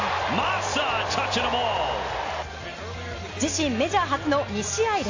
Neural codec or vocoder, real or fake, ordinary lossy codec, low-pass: none; real; AAC, 48 kbps; 7.2 kHz